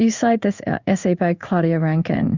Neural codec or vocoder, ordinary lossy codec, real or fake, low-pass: codec, 16 kHz in and 24 kHz out, 1 kbps, XY-Tokenizer; Opus, 64 kbps; fake; 7.2 kHz